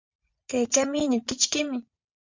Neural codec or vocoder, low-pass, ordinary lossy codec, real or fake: vocoder, 44.1 kHz, 80 mel bands, Vocos; 7.2 kHz; MP3, 64 kbps; fake